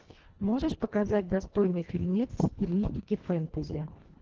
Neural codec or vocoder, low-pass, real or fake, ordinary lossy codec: codec, 24 kHz, 1.5 kbps, HILCodec; 7.2 kHz; fake; Opus, 16 kbps